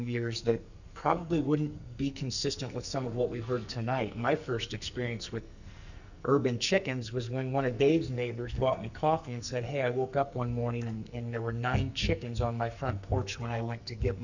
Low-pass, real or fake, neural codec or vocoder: 7.2 kHz; fake; codec, 44.1 kHz, 2.6 kbps, SNAC